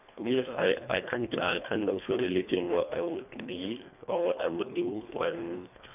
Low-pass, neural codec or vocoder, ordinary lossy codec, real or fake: 3.6 kHz; codec, 24 kHz, 1.5 kbps, HILCodec; none; fake